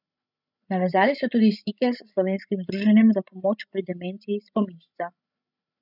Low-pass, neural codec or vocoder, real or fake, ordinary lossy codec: 5.4 kHz; codec, 16 kHz, 16 kbps, FreqCodec, larger model; fake; none